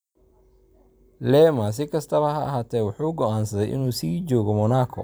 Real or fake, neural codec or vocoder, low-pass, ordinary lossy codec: fake; vocoder, 44.1 kHz, 128 mel bands every 256 samples, BigVGAN v2; none; none